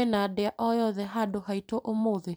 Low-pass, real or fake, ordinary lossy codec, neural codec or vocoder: none; fake; none; vocoder, 44.1 kHz, 128 mel bands every 256 samples, BigVGAN v2